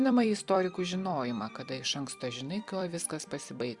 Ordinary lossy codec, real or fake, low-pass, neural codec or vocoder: Opus, 64 kbps; fake; 10.8 kHz; vocoder, 44.1 kHz, 128 mel bands every 256 samples, BigVGAN v2